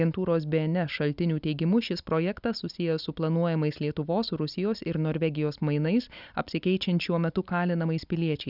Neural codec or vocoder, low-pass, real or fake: none; 5.4 kHz; real